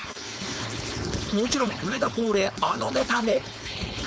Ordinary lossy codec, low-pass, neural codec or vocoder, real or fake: none; none; codec, 16 kHz, 4.8 kbps, FACodec; fake